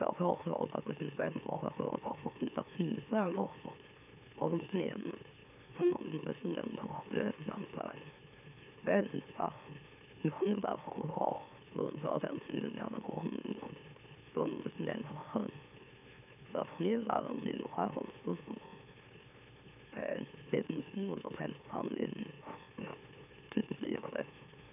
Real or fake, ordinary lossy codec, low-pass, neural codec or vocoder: fake; none; 3.6 kHz; autoencoder, 44.1 kHz, a latent of 192 numbers a frame, MeloTTS